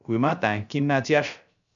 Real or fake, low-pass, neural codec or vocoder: fake; 7.2 kHz; codec, 16 kHz, 0.3 kbps, FocalCodec